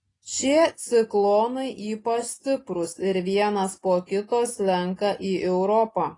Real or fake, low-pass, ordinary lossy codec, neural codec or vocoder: real; 10.8 kHz; AAC, 32 kbps; none